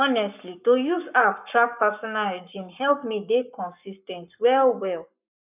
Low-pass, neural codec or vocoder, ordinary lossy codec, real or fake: 3.6 kHz; codec, 44.1 kHz, 7.8 kbps, Pupu-Codec; none; fake